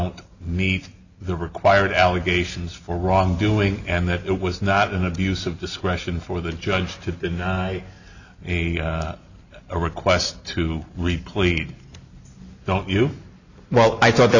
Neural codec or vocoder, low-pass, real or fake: none; 7.2 kHz; real